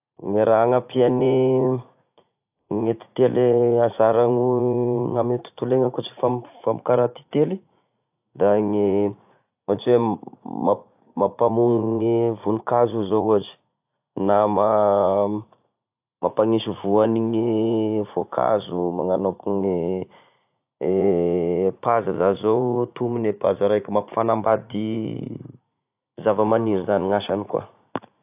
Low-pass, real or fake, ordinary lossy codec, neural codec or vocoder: 3.6 kHz; fake; AAC, 32 kbps; vocoder, 44.1 kHz, 80 mel bands, Vocos